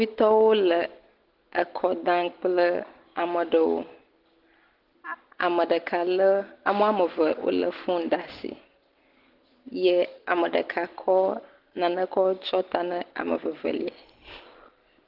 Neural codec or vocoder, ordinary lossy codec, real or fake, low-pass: none; Opus, 16 kbps; real; 5.4 kHz